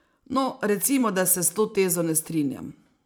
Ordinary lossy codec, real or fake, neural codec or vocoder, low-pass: none; fake; vocoder, 44.1 kHz, 128 mel bands every 512 samples, BigVGAN v2; none